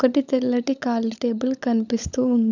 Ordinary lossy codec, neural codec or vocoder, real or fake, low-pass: none; codec, 16 kHz, 16 kbps, FunCodec, trained on LibriTTS, 50 frames a second; fake; 7.2 kHz